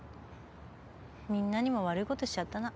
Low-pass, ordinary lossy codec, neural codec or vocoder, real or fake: none; none; none; real